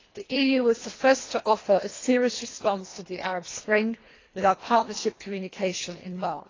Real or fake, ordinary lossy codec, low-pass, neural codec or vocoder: fake; AAC, 32 kbps; 7.2 kHz; codec, 24 kHz, 1.5 kbps, HILCodec